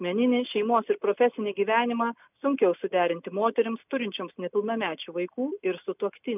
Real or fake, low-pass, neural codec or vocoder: real; 3.6 kHz; none